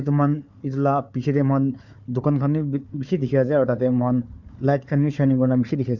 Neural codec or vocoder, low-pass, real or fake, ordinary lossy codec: codec, 16 kHz, 4 kbps, FunCodec, trained on Chinese and English, 50 frames a second; 7.2 kHz; fake; none